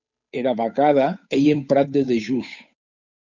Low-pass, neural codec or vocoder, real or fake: 7.2 kHz; codec, 16 kHz, 8 kbps, FunCodec, trained on Chinese and English, 25 frames a second; fake